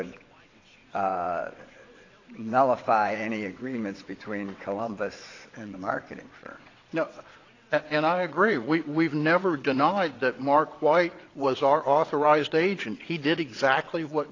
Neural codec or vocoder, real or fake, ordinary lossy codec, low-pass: vocoder, 22.05 kHz, 80 mel bands, WaveNeXt; fake; AAC, 32 kbps; 7.2 kHz